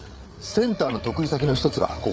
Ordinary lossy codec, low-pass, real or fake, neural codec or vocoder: none; none; fake; codec, 16 kHz, 8 kbps, FreqCodec, larger model